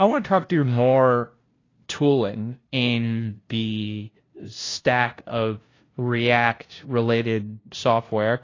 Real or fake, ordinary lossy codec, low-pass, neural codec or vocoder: fake; AAC, 32 kbps; 7.2 kHz; codec, 16 kHz, 0.5 kbps, FunCodec, trained on LibriTTS, 25 frames a second